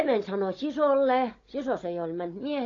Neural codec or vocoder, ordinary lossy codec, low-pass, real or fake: codec, 16 kHz, 16 kbps, FunCodec, trained on Chinese and English, 50 frames a second; AAC, 32 kbps; 7.2 kHz; fake